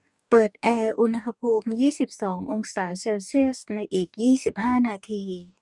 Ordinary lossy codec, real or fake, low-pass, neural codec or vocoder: none; fake; 10.8 kHz; codec, 44.1 kHz, 2.6 kbps, DAC